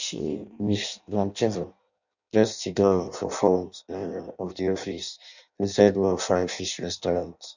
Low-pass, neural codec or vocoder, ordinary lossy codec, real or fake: 7.2 kHz; codec, 16 kHz in and 24 kHz out, 0.6 kbps, FireRedTTS-2 codec; none; fake